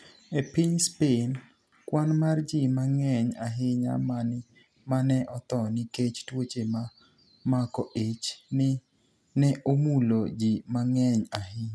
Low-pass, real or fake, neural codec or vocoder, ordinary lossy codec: none; real; none; none